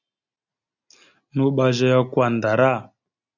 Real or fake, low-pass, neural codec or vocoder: real; 7.2 kHz; none